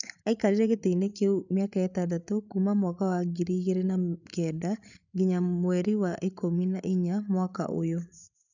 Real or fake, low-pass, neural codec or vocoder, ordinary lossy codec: fake; 7.2 kHz; codec, 16 kHz, 8 kbps, FreqCodec, larger model; none